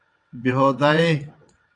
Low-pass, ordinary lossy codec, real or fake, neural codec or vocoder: 9.9 kHz; AAC, 64 kbps; fake; vocoder, 22.05 kHz, 80 mel bands, WaveNeXt